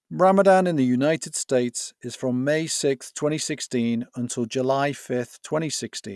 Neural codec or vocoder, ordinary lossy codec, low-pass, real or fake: none; none; none; real